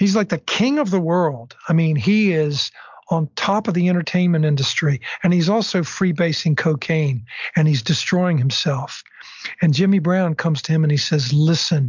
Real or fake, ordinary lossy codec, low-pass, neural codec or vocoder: real; MP3, 64 kbps; 7.2 kHz; none